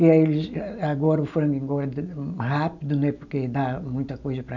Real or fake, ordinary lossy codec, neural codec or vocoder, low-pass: real; none; none; 7.2 kHz